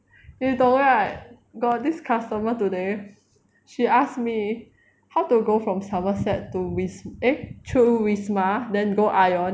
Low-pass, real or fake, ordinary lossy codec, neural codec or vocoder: none; real; none; none